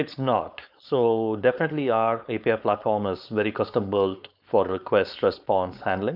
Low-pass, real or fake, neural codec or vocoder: 5.4 kHz; fake; codec, 16 kHz, 4.8 kbps, FACodec